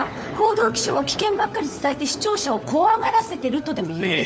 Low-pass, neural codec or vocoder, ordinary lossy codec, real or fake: none; codec, 16 kHz, 4 kbps, FunCodec, trained on LibriTTS, 50 frames a second; none; fake